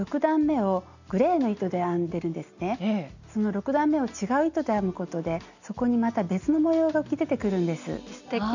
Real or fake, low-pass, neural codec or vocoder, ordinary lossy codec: real; 7.2 kHz; none; none